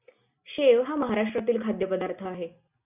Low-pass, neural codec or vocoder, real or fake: 3.6 kHz; none; real